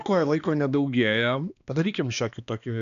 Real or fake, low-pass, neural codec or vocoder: fake; 7.2 kHz; codec, 16 kHz, 2 kbps, X-Codec, HuBERT features, trained on balanced general audio